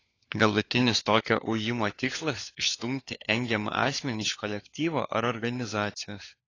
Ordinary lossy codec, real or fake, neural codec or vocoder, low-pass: AAC, 32 kbps; fake; codec, 16 kHz, 4 kbps, FunCodec, trained on LibriTTS, 50 frames a second; 7.2 kHz